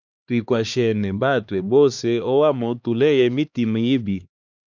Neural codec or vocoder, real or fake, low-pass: codec, 16 kHz, 2 kbps, X-Codec, HuBERT features, trained on LibriSpeech; fake; 7.2 kHz